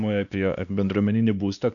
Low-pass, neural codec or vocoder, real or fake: 7.2 kHz; codec, 16 kHz, 2 kbps, X-Codec, WavLM features, trained on Multilingual LibriSpeech; fake